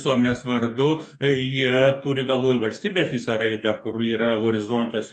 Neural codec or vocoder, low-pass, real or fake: codec, 44.1 kHz, 2.6 kbps, DAC; 10.8 kHz; fake